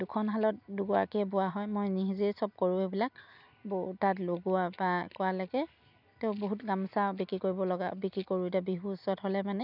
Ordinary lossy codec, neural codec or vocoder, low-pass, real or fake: none; none; 5.4 kHz; real